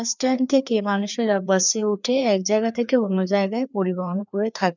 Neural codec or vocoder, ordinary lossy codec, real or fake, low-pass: codec, 16 kHz, 2 kbps, FreqCodec, larger model; none; fake; 7.2 kHz